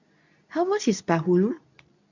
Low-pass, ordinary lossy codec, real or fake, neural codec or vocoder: 7.2 kHz; none; fake; codec, 24 kHz, 0.9 kbps, WavTokenizer, medium speech release version 2